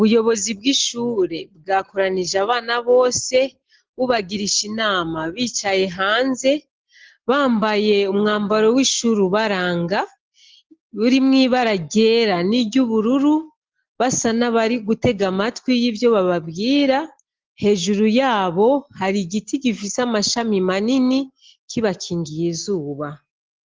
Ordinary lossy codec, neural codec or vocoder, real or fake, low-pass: Opus, 16 kbps; none; real; 7.2 kHz